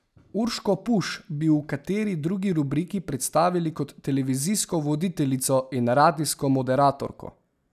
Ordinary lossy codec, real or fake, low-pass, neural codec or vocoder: none; real; 14.4 kHz; none